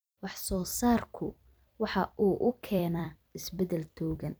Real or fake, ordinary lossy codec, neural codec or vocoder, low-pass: fake; none; vocoder, 44.1 kHz, 128 mel bands every 256 samples, BigVGAN v2; none